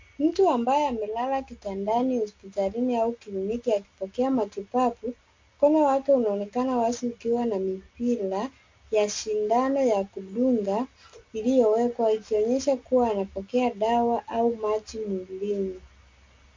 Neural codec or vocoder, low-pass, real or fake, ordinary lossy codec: none; 7.2 kHz; real; MP3, 48 kbps